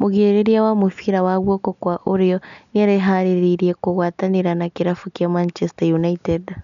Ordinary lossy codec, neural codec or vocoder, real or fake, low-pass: none; none; real; 7.2 kHz